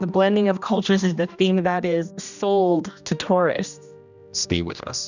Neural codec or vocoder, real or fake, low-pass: codec, 16 kHz, 1 kbps, X-Codec, HuBERT features, trained on general audio; fake; 7.2 kHz